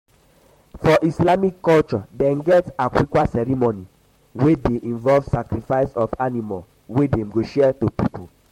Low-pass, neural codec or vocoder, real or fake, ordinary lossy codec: 19.8 kHz; vocoder, 44.1 kHz, 128 mel bands, Pupu-Vocoder; fake; MP3, 64 kbps